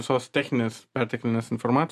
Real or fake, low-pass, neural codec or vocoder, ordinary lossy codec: real; 14.4 kHz; none; MP3, 64 kbps